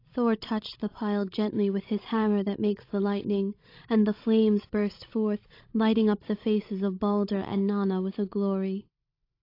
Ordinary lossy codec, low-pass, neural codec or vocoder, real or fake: AAC, 32 kbps; 5.4 kHz; codec, 16 kHz, 16 kbps, FreqCodec, larger model; fake